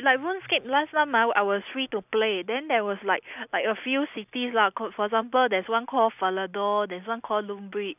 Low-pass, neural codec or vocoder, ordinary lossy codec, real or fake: 3.6 kHz; none; none; real